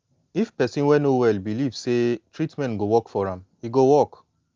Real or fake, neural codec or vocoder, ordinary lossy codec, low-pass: real; none; Opus, 32 kbps; 7.2 kHz